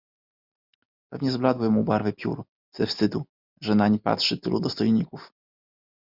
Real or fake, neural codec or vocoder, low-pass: real; none; 5.4 kHz